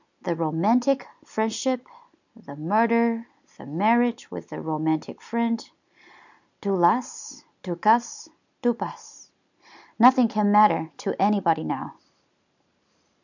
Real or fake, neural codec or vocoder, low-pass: real; none; 7.2 kHz